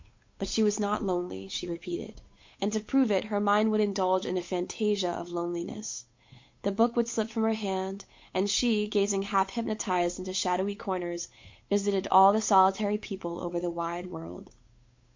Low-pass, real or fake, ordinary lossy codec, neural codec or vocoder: 7.2 kHz; fake; MP3, 48 kbps; codec, 16 kHz, 8 kbps, FunCodec, trained on Chinese and English, 25 frames a second